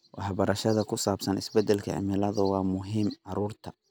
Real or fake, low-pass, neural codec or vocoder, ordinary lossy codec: real; none; none; none